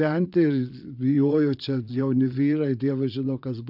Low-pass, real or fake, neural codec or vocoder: 5.4 kHz; fake; vocoder, 22.05 kHz, 80 mel bands, Vocos